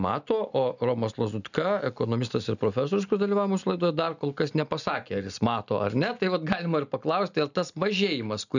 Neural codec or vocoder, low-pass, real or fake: none; 7.2 kHz; real